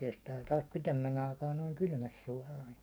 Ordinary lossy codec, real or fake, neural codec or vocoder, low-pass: none; fake; codec, 44.1 kHz, 7.8 kbps, DAC; none